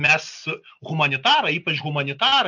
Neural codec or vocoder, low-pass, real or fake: none; 7.2 kHz; real